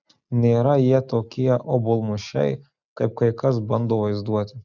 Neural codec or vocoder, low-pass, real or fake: none; 7.2 kHz; real